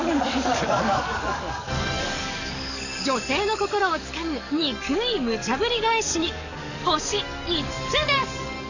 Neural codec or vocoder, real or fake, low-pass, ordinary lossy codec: codec, 44.1 kHz, 7.8 kbps, DAC; fake; 7.2 kHz; none